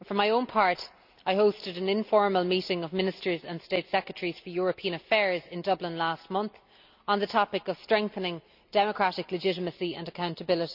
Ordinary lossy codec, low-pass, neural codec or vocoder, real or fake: none; 5.4 kHz; none; real